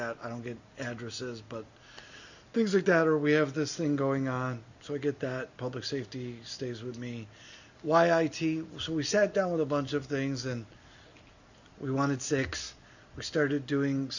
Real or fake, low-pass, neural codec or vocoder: real; 7.2 kHz; none